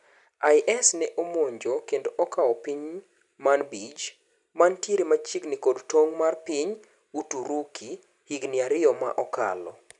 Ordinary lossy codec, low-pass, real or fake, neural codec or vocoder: none; 10.8 kHz; real; none